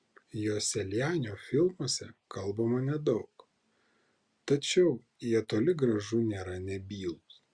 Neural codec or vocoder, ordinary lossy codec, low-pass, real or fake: none; Opus, 64 kbps; 9.9 kHz; real